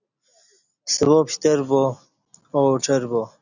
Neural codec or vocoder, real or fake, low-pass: none; real; 7.2 kHz